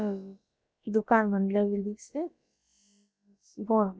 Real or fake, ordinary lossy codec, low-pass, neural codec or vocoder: fake; none; none; codec, 16 kHz, about 1 kbps, DyCAST, with the encoder's durations